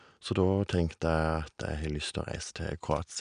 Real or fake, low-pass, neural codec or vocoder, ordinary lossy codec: real; 9.9 kHz; none; none